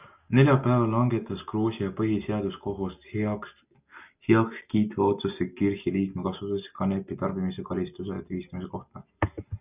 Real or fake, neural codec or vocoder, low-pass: real; none; 3.6 kHz